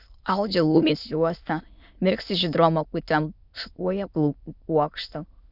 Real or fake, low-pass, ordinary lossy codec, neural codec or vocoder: fake; 5.4 kHz; Opus, 64 kbps; autoencoder, 22.05 kHz, a latent of 192 numbers a frame, VITS, trained on many speakers